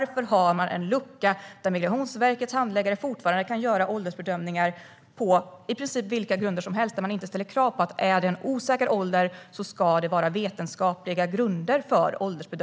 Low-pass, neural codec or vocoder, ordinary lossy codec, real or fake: none; none; none; real